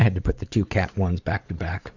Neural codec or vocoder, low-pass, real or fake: vocoder, 44.1 kHz, 80 mel bands, Vocos; 7.2 kHz; fake